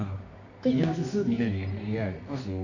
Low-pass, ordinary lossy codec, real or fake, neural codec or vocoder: 7.2 kHz; none; fake; codec, 24 kHz, 0.9 kbps, WavTokenizer, medium music audio release